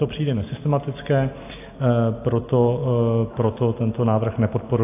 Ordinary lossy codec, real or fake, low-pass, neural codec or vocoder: AAC, 24 kbps; real; 3.6 kHz; none